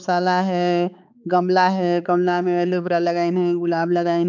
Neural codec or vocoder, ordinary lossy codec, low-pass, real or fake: codec, 16 kHz, 4 kbps, X-Codec, HuBERT features, trained on balanced general audio; none; 7.2 kHz; fake